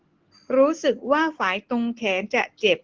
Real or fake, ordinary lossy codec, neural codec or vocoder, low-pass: real; Opus, 16 kbps; none; 7.2 kHz